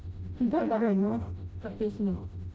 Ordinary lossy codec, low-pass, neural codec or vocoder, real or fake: none; none; codec, 16 kHz, 0.5 kbps, FreqCodec, smaller model; fake